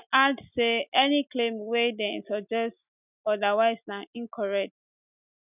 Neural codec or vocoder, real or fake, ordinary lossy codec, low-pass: none; real; none; 3.6 kHz